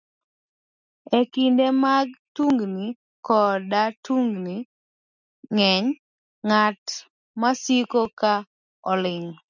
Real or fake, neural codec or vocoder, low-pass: real; none; 7.2 kHz